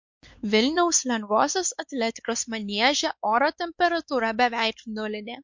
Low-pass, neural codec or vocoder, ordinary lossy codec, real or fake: 7.2 kHz; codec, 16 kHz, 4 kbps, X-Codec, WavLM features, trained on Multilingual LibriSpeech; MP3, 48 kbps; fake